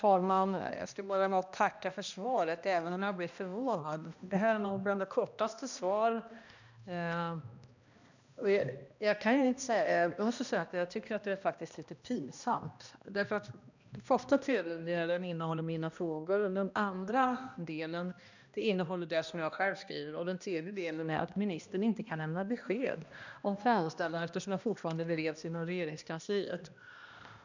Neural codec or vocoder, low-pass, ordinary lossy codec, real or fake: codec, 16 kHz, 1 kbps, X-Codec, HuBERT features, trained on balanced general audio; 7.2 kHz; none; fake